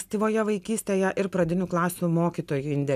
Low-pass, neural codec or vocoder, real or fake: 14.4 kHz; none; real